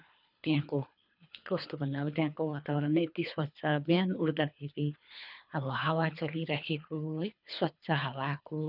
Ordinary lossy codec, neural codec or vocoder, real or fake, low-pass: none; codec, 24 kHz, 3 kbps, HILCodec; fake; 5.4 kHz